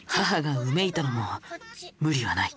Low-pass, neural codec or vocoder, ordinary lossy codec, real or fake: none; none; none; real